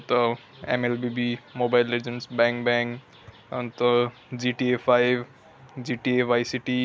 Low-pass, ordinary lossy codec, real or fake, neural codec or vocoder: none; none; real; none